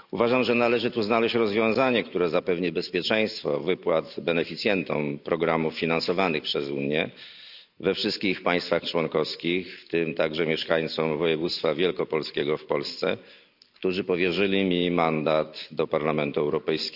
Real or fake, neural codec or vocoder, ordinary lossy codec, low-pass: real; none; none; 5.4 kHz